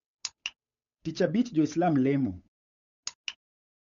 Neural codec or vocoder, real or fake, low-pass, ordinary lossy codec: codec, 16 kHz, 8 kbps, FunCodec, trained on Chinese and English, 25 frames a second; fake; 7.2 kHz; MP3, 64 kbps